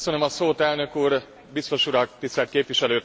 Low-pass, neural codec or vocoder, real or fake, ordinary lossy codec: none; none; real; none